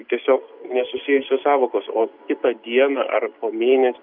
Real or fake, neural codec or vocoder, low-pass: real; none; 5.4 kHz